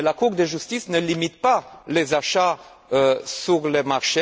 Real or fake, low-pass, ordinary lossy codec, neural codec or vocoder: real; none; none; none